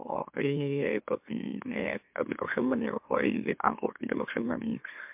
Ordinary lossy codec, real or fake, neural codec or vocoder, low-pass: MP3, 32 kbps; fake; autoencoder, 44.1 kHz, a latent of 192 numbers a frame, MeloTTS; 3.6 kHz